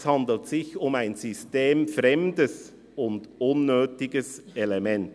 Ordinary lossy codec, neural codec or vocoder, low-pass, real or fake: none; none; none; real